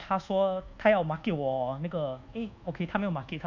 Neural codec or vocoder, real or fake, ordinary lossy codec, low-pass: codec, 24 kHz, 1.2 kbps, DualCodec; fake; none; 7.2 kHz